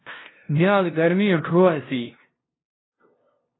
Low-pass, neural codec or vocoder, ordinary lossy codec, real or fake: 7.2 kHz; codec, 16 kHz, 0.5 kbps, FunCodec, trained on LibriTTS, 25 frames a second; AAC, 16 kbps; fake